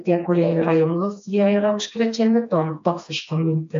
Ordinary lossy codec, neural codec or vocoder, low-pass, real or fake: MP3, 48 kbps; codec, 16 kHz, 2 kbps, FreqCodec, smaller model; 7.2 kHz; fake